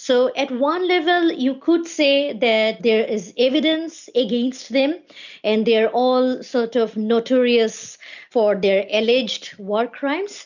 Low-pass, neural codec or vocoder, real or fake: 7.2 kHz; none; real